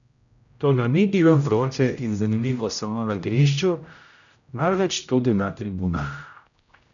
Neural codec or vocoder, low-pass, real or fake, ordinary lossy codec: codec, 16 kHz, 0.5 kbps, X-Codec, HuBERT features, trained on general audio; 7.2 kHz; fake; none